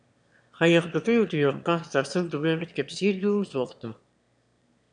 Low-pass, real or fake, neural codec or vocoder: 9.9 kHz; fake; autoencoder, 22.05 kHz, a latent of 192 numbers a frame, VITS, trained on one speaker